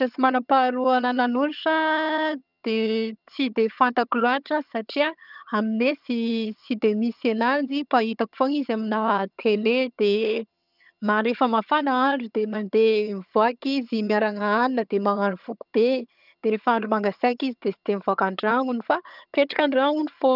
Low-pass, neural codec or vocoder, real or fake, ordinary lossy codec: 5.4 kHz; vocoder, 22.05 kHz, 80 mel bands, HiFi-GAN; fake; none